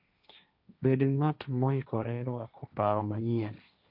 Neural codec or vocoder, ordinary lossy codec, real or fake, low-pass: codec, 16 kHz, 1.1 kbps, Voila-Tokenizer; none; fake; 5.4 kHz